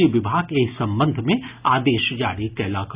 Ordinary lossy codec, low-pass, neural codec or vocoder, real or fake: Opus, 64 kbps; 3.6 kHz; none; real